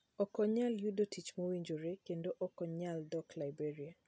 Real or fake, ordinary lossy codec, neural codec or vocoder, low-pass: real; none; none; none